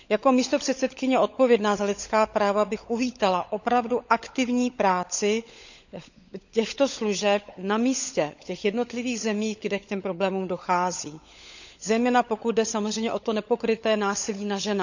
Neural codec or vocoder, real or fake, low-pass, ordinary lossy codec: codec, 16 kHz, 16 kbps, FunCodec, trained on LibriTTS, 50 frames a second; fake; 7.2 kHz; none